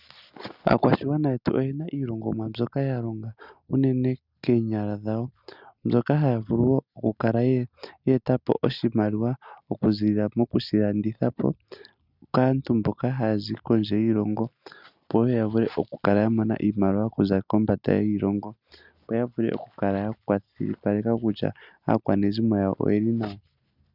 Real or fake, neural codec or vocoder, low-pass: real; none; 5.4 kHz